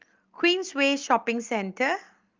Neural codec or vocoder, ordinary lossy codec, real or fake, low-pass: none; Opus, 32 kbps; real; 7.2 kHz